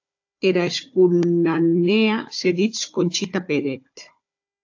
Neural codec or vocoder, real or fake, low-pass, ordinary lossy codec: codec, 16 kHz, 4 kbps, FunCodec, trained on Chinese and English, 50 frames a second; fake; 7.2 kHz; AAC, 48 kbps